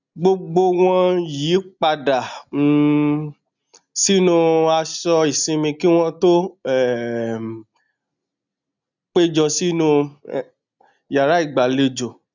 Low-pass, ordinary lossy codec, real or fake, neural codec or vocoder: 7.2 kHz; none; real; none